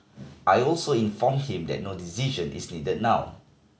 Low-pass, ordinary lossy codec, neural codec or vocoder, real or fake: none; none; none; real